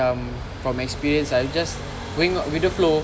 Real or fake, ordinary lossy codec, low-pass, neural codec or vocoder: real; none; none; none